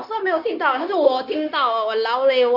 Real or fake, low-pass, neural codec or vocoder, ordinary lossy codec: fake; 5.4 kHz; codec, 16 kHz, 0.9 kbps, LongCat-Audio-Codec; none